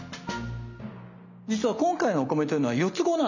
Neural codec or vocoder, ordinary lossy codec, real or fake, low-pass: none; none; real; 7.2 kHz